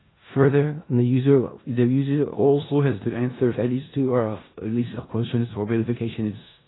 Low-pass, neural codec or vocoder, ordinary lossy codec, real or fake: 7.2 kHz; codec, 16 kHz in and 24 kHz out, 0.4 kbps, LongCat-Audio-Codec, four codebook decoder; AAC, 16 kbps; fake